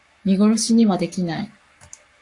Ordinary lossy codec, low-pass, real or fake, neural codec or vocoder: AAC, 64 kbps; 10.8 kHz; fake; codec, 44.1 kHz, 7.8 kbps, Pupu-Codec